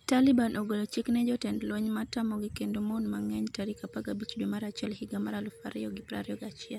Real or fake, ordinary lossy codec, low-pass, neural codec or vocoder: real; none; 19.8 kHz; none